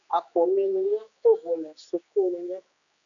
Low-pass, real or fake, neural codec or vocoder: 7.2 kHz; fake; codec, 16 kHz, 2 kbps, X-Codec, HuBERT features, trained on general audio